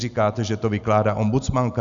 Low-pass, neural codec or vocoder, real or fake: 7.2 kHz; none; real